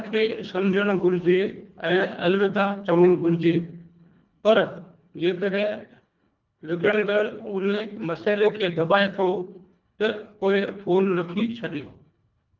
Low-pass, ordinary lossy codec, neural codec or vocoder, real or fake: 7.2 kHz; Opus, 24 kbps; codec, 24 kHz, 1.5 kbps, HILCodec; fake